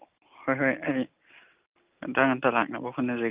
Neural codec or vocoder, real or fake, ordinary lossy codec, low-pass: none; real; Opus, 24 kbps; 3.6 kHz